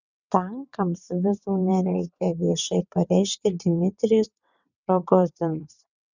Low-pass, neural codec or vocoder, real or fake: 7.2 kHz; vocoder, 22.05 kHz, 80 mel bands, WaveNeXt; fake